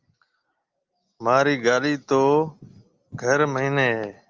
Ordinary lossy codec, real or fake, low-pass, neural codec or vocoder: Opus, 32 kbps; real; 7.2 kHz; none